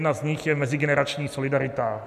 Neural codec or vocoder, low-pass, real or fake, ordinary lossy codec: none; 14.4 kHz; real; MP3, 96 kbps